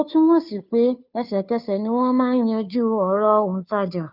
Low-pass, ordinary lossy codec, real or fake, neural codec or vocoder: 5.4 kHz; none; fake; codec, 16 kHz, 2 kbps, FunCodec, trained on Chinese and English, 25 frames a second